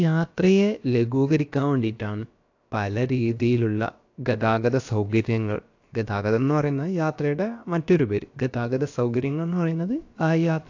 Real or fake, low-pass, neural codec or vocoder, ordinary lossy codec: fake; 7.2 kHz; codec, 16 kHz, about 1 kbps, DyCAST, with the encoder's durations; AAC, 48 kbps